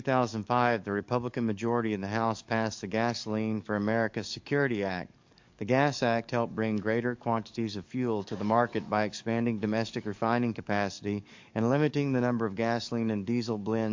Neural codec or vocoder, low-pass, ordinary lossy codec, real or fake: codec, 44.1 kHz, 7.8 kbps, DAC; 7.2 kHz; MP3, 48 kbps; fake